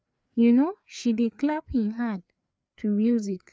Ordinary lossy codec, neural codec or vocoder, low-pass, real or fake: none; codec, 16 kHz, 4 kbps, FreqCodec, larger model; none; fake